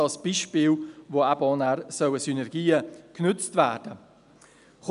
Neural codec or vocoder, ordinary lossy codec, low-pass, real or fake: none; none; 10.8 kHz; real